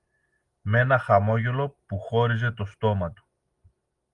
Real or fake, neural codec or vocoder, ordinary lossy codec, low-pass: real; none; Opus, 32 kbps; 10.8 kHz